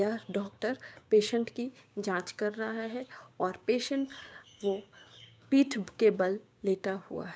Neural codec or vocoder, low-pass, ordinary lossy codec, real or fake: codec, 16 kHz, 6 kbps, DAC; none; none; fake